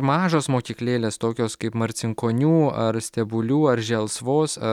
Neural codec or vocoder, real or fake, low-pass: none; real; 19.8 kHz